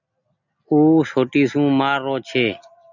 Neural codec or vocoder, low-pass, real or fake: none; 7.2 kHz; real